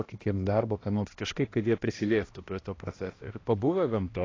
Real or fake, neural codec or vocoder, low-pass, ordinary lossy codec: fake; codec, 16 kHz, 1 kbps, X-Codec, HuBERT features, trained on balanced general audio; 7.2 kHz; AAC, 32 kbps